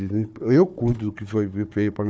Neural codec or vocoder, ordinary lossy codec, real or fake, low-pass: codec, 16 kHz, 4 kbps, FunCodec, trained on Chinese and English, 50 frames a second; none; fake; none